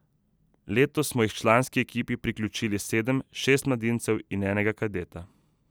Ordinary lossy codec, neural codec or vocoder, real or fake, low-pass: none; none; real; none